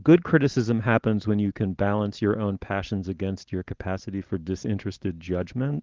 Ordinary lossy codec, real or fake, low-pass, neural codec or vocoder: Opus, 16 kbps; real; 7.2 kHz; none